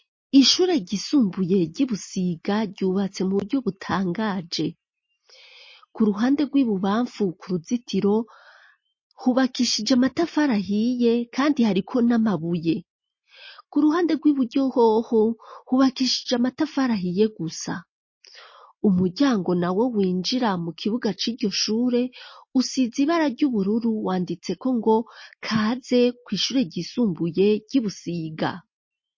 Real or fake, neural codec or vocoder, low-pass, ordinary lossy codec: real; none; 7.2 kHz; MP3, 32 kbps